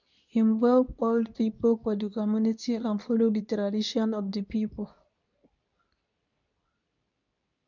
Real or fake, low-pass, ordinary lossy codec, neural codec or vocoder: fake; 7.2 kHz; none; codec, 24 kHz, 0.9 kbps, WavTokenizer, medium speech release version 2